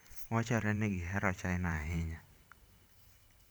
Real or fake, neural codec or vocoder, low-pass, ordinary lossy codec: fake; vocoder, 44.1 kHz, 128 mel bands every 512 samples, BigVGAN v2; none; none